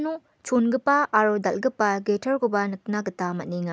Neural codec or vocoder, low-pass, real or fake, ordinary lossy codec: none; none; real; none